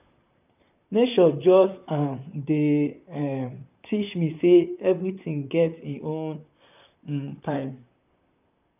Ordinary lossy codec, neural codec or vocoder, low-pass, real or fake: none; vocoder, 44.1 kHz, 128 mel bands, Pupu-Vocoder; 3.6 kHz; fake